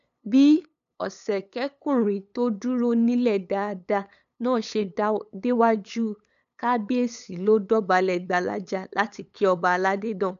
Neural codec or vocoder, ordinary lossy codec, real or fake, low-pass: codec, 16 kHz, 8 kbps, FunCodec, trained on LibriTTS, 25 frames a second; none; fake; 7.2 kHz